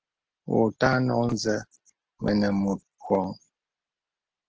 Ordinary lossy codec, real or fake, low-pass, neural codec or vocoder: Opus, 16 kbps; real; 7.2 kHz; none